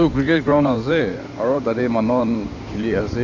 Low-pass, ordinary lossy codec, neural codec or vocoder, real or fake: 7.2 kHz; none; codec, 16 kHz in and 24 kHz out, 2.2 kbps, FireRedTTS-2 codec; fake